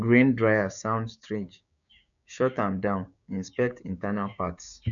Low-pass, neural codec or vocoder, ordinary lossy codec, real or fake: 7.2 kHz; codec, 16 kHz, 6 kbps, DAC; none; fake